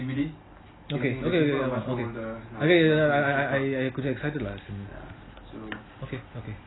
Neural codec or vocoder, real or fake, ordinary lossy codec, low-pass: none; real; AAC, 16 kbps; 7.2 kHz